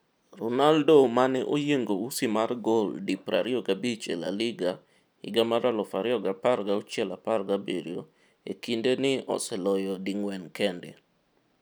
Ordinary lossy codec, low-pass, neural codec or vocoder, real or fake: none; none; none; real